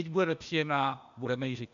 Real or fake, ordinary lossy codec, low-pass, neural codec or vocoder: fake; MP3, 96 kbps; 7.2 kHz; codec, 16 kHz, 0.8 kbps, ZipCodec